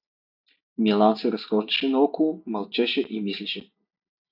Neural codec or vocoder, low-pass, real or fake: none; 5.4 kHz; real